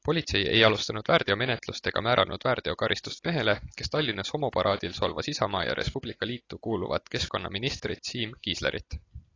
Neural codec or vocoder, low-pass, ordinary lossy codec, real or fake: none; 7.2 kHz; AAC, 32 kbps; real